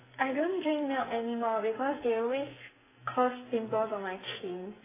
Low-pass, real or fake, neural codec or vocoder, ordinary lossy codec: 3.6 kHz; fake; codec, 44.1 kHz, 2.6 kbps, SNAC; AAC, 16 kbps